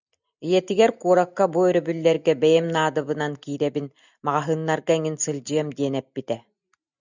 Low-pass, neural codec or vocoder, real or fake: 7.2 kHz; none; real